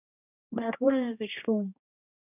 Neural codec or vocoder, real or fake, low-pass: codec, 16 kHz, 1 kbps, X-Codec, HuBERT features, trained on general audio; fake; 3.6 kHz